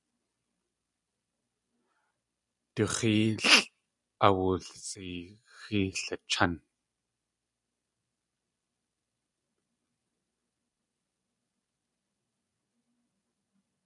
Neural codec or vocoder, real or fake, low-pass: none; real; 10.8 kHz